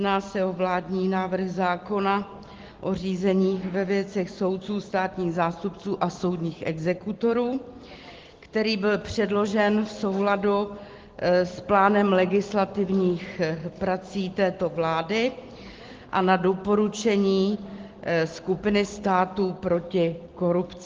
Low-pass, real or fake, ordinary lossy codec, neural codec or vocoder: 7.2 kHz; real; Opus, 16 kbps; none